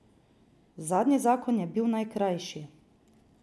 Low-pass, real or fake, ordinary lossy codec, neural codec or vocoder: none; real; none; none